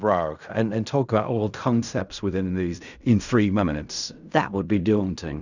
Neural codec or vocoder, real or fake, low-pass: codec, 16 kHz in and 24 kHz out, 0.4 kbps, LongCat-Audio-Codec, fine tuned four codebook decoder; fake; 7.2 kHz